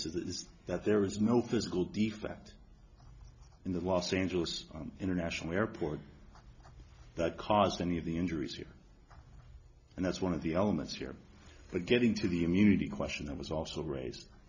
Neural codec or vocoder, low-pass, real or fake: none; 7.2 kHz; real